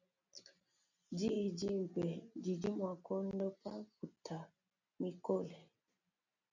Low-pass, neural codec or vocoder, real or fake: 7.2 kHz; none; real